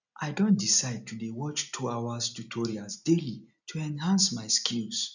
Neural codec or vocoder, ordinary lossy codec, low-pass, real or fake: none; none; 7.2 kHz; real